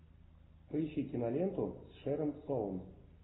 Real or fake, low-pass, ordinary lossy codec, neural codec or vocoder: real; 7.2 kHz; AAC, 16 kbps; none